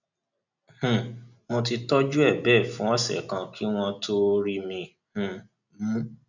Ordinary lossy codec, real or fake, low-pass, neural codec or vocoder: none; real; 7.2 kHz; none